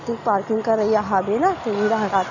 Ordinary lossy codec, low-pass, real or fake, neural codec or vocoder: none; 7.2 kHz; real; none